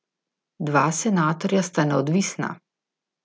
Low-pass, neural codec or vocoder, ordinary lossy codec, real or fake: none; none; none; real